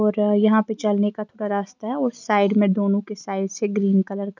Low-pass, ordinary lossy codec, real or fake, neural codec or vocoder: 7.2 kHz; none; real; none